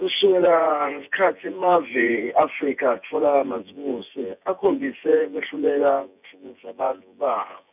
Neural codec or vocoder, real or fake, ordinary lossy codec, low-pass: vocoder, 24 kHz, 100 mel bands, Vocos; fake; none; 3.6 kHz